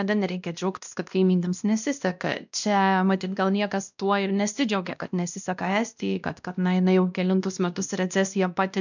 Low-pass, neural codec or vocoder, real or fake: 7.2 kHz; codec, 16 kHz, 1 kbps, X-Codec, WavLM features, trained on Multilingual LibriSpeech; fake